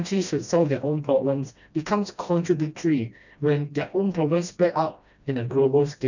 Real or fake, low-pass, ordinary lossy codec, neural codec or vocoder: fake; 7.2 kHz; none; codec, 16 kHz, 1 kbps, FreqCodec, smaller model